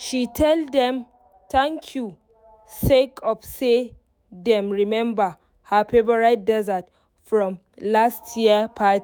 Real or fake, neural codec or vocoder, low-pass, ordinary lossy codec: fake; autoencoder, 48 kHz, 128 numbers a frame, DAC-VAE, trained on Japanese speech; none; none